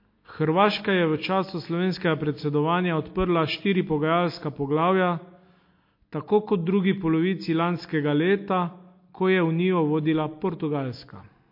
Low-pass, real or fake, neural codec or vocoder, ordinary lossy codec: 5.4 kHz; real; none; MP3, 32 kbps